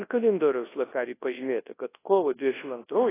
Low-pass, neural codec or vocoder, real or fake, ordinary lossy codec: 3.6 kHz; codec, 24 kHz, 0.9 kbps, WavTokenizer, large speech release; fake; AAC, 16 kbps